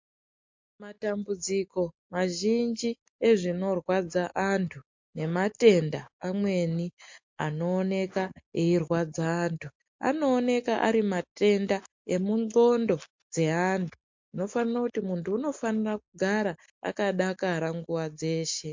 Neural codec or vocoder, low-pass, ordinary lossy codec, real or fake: none; 7.2 kHz; MP3, 48 kbps; real